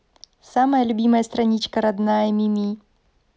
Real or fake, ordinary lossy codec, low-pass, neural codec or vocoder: real; none; none; none